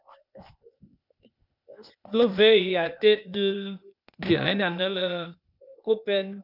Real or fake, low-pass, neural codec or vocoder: fake; 5.4 kHz; codec, 16 kHz, 0.8 kbps, ZipCodec